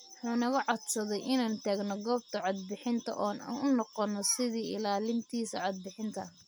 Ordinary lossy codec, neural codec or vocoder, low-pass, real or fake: none; none; none; real